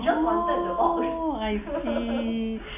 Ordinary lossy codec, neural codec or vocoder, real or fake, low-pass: none; none; real; 3.6 kHz